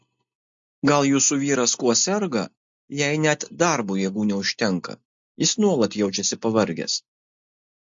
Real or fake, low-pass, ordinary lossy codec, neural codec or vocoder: real; 7.2 kHz; MP3, 48 kbps; none